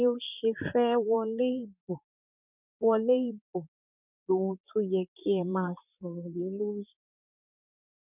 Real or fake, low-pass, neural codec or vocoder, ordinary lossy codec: fake; 3.6 kHz; vocoder, 44.1 kHz, 128 mel bands, Pupu-Vocoder; none